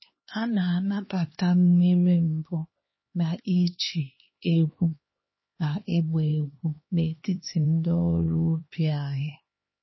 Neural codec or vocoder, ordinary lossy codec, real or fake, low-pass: codec, 16 kHz, 2 kbps, X-Codec, HuBERT features, trained on LibriSpeech; MP3, 24 kbps; fake; 7.2 kHz